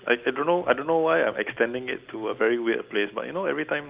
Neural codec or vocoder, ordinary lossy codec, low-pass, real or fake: none; Opus, 24 kbps; 3.6 kHz; real